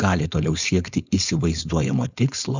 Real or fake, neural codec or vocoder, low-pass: fake; codec, 16 kHz, 4.8 kbps, FACodec; 7.2 kHz